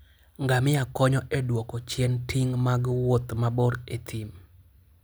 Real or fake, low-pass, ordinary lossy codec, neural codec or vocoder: real; none; none; none